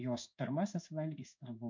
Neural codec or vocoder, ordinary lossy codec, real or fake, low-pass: codec, 16 kHz in and 24 kHz out, 1 kbps, XY-Tokenizer; MP3, 64 kbps; fake; 7.2 kHz